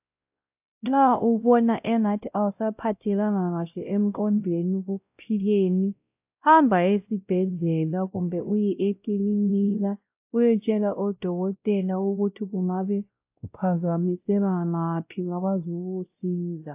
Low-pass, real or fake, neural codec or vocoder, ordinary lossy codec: 3.6 kHz; fake; codec, 16 kHz, 0.5 kbps, X-Codec, WavLM features, trained on Multilingual LibriSpeech; AAC, 32 kbps